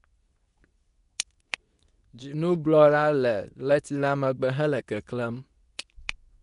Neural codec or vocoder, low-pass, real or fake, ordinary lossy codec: codec, 24 kHz, 0.9 kbps, WavTokenizer, medium speech release version 2; 10.8 kHz; fake; none